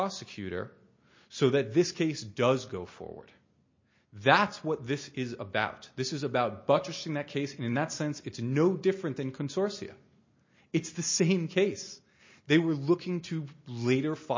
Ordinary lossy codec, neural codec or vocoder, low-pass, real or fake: MP3, 32 kbps; none; 7.2 kHz; real